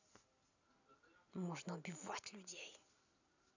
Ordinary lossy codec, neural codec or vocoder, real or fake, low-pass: none; none; real; 7.2 kHz